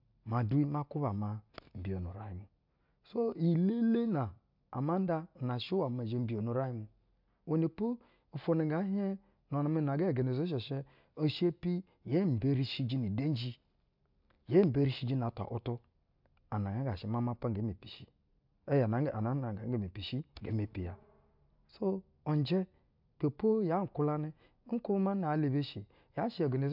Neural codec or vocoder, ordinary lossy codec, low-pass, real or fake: none; MP3, 48 kbps; 5.4 kHz; real